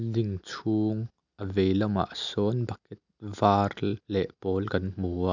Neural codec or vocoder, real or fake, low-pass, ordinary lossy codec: none; real; 7.2 kHz; none